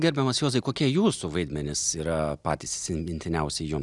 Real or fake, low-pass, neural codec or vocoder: real; 10.8 kHz; none